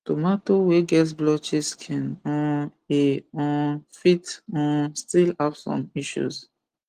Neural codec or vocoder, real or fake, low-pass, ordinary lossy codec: none; real; 14.4 kHz; Opus, 24 kbps